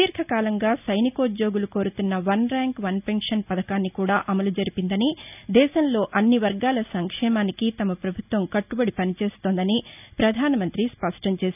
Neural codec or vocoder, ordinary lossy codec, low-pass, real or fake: none; none; 3.6 kHz; real